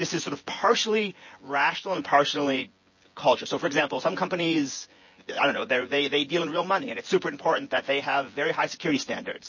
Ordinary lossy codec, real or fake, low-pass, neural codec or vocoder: MP3, 32 kbps; fake; 7.2 kHz; vocoder, 24 kHz, 100 mel bands, Vocos